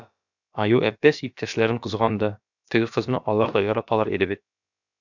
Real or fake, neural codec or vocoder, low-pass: fake; codec, 16 kHz, about 1 kbps, DyCAST, with the encoder's durations; 7.2 kHz